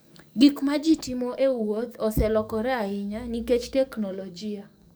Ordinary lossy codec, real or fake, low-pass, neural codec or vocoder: none; fake; none; codec, 44.1 kHz, 7.8 kbps, DAC